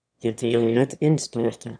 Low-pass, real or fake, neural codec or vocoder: 9.9 kHz; fake; autoencoder, 22.05 kHz, a latent of 192 numbers a frame, VITS, trained on one speaker